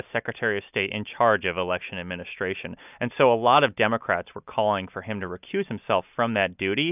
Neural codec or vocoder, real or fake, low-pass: none; real; 3.6 kHz